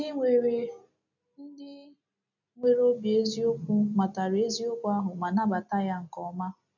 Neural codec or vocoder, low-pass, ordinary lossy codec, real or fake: none; 7.2 kHz; none; real